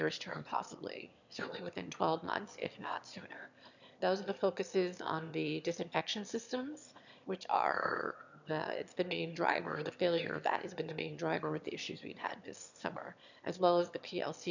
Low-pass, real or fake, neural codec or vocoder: 7.2 kHz; fake; autoencoder, 22.05 kHz, a latent of 192 numbers a frame, VITS, trained on one speaker